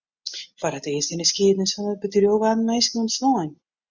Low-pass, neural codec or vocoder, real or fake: 7.2 kHz; none; real